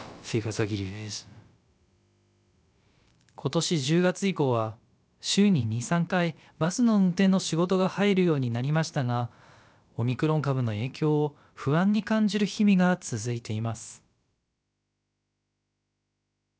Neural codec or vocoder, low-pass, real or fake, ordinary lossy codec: codec, 16 kHz, about 1 kbps, DyCAST, with the encoder's durations; none; fake; none